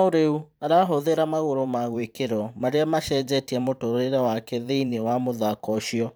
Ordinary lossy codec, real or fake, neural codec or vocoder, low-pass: none; fake; vocoder, 44.1 kHz, 128 mel bands every 512 samples, BigVGAN v2; none